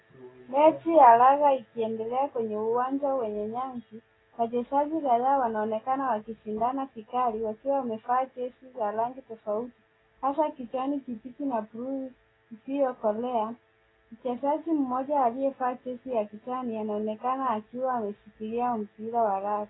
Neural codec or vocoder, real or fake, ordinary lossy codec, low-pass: none; real; AAC, 16 kbps; 7.2 kHz